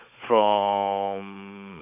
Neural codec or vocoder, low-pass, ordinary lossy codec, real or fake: codec, 16 kHz, 16 kbps, FunCodec, trained on Chinese and English, 50 frames a second; 3.6 kHz; none; fake